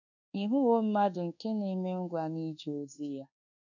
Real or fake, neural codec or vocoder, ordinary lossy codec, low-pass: fake; codec, 24 kHz, 1.2 kbps, DualCodec; none; 7.2 kHz